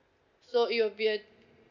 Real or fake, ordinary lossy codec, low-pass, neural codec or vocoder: real; none; 7.2 kHz; none